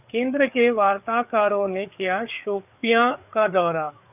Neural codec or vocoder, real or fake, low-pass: codec, 16 kHz, 4 kbps, FreqCodec, larger model; fake; 3.6 kHz